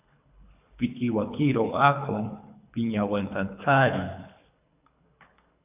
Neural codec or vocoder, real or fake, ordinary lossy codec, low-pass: codec, 24 kHz, 3 kbps, HILCodec; fake; AAC, 32 kbps; 3.6 kHz